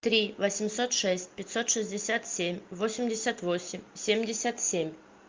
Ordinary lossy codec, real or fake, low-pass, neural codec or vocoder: Opus, 24 kbps; real; 7.2 kHz; none